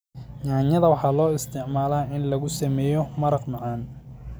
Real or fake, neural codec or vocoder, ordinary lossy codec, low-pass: real; none; none; none